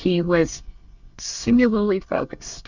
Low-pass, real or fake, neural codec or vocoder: 7.2 kHz; fake; codec, 24 kHz, 1 kbps, SNAC